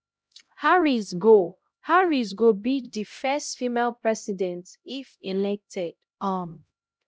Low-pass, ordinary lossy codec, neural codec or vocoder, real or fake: none; none; codec, 16 kHz, 0.5 kbps, X-Codec, HuBERT features, trained on LibriSpeech; fake